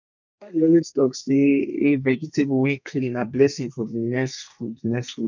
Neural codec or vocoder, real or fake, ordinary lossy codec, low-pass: codec, 32 kHz, 1.9 kbps, SNAC; fake; none; 7.2 kHz